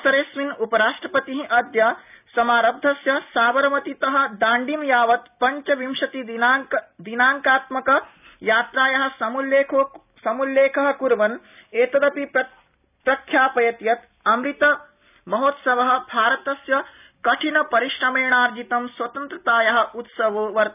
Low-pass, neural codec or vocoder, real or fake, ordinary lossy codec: 3.6 kHz; none; real; none